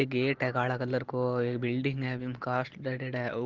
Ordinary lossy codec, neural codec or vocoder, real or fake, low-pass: Opus, 16 kbps; none; real; 7.2 kHz